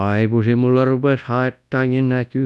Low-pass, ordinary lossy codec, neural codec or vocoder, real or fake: none; none; codec, 24 kHz, 0.9 kbps, WavTokenizer, large speech release; fake